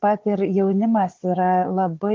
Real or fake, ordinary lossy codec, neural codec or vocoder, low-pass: fake; Opus, 16 kbps; codec, 16 kHz, 4 kbps, FunCodec, trained on Chinese and English, 50 frames a second; 7.2 kHz